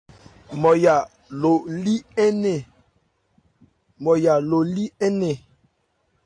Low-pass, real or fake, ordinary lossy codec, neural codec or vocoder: 9.9 kHz; real; AAC, 48 kbps; none